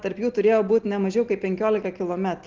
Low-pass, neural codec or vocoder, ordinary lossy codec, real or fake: 7.2 kHz; none; Opus, 16 kbps; real